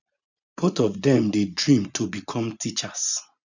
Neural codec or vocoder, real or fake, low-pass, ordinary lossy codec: vocoder, 24 kHz, 100 mel bands, Vocos; fake; 7.2 kHz; none